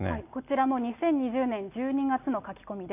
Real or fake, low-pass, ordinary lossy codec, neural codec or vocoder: real; 3.6 kHz; none; none